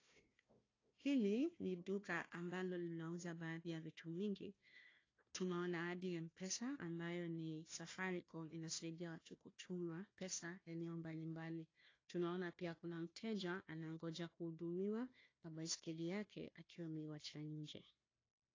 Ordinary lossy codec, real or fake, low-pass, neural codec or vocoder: AAC, 32 kbps; fake; 7.2 kHz; codec, 16 kHz, 1 kbps, FunCodec, trained on Chinese and English, 50 frames a second